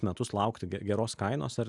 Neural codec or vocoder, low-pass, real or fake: none; 10.8 kHz; real